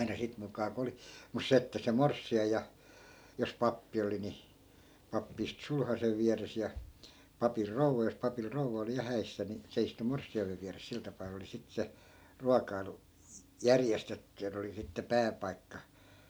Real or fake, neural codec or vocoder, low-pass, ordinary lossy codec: real; none; none; none